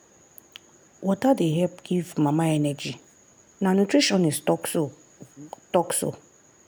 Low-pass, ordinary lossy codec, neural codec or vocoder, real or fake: none; none; none; real